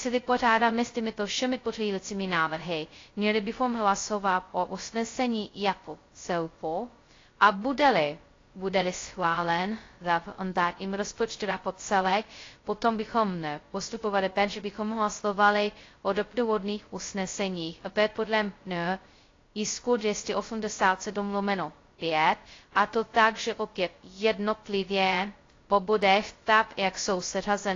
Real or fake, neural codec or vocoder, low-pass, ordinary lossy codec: fake; codec, 16 kHz, 0.2 kbps, FocalCodec; 7.2 kHz; AAC, 32 kbps